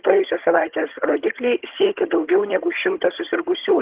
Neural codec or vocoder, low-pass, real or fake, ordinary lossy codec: vocoder, 22.05 kHz, 80 mel bands, HiFi-GAN; 3.6 kHz; fake; Opus, 16 kbps